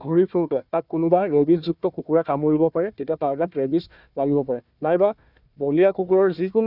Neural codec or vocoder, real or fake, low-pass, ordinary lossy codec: codec, 16 kHz, 1 kbps, FunCodec, trained on Chinese and English, 50 frames a second; fake; 5.4 kHz; none